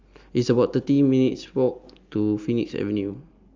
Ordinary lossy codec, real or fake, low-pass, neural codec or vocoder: Opus, 32 kbps; real; 7.2 kHz; none